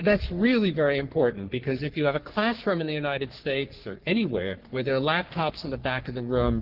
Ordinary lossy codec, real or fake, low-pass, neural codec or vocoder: Opus, 16 kbps; fake; 5.4 kHz; codec, 44.1 kHz, 3.4 kbps, Pupu-Codec